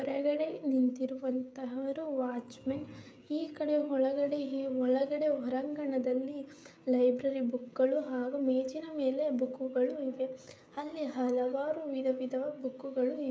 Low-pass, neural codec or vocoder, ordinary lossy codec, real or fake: none; codec, 16 kHz, 16 kbps, FreqCodec, smaller model; none; fake